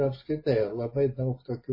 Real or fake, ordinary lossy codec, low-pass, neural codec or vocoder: real; MP3, 24 kbps; 5.4 kHz; none